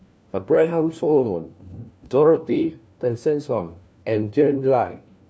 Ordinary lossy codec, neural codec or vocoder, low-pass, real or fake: none; codec, 16 kHz, 1 kbps, FunCodec, trained on LibriTTS, 50 frames a second; none; fake